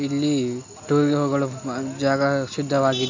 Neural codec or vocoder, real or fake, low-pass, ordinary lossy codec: none; real; 7.2 kHz; AAC, 48 kbps